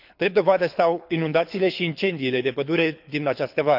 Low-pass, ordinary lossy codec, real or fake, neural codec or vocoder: 5.4 kHz; none; fake; codec, 24 kHz, 6 kbps, HILCodec